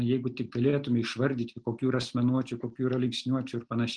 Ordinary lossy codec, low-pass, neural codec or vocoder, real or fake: Opus, 24 kbps; 9.9 kHz; none; real